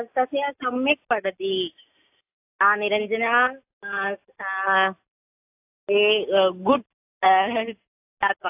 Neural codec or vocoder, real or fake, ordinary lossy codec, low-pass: none; real; AAC, 32 kbps; 3.6 kHz